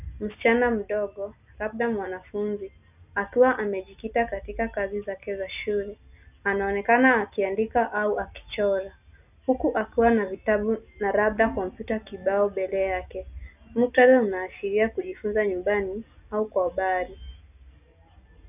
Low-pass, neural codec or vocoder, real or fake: 3.6 kHz; none; real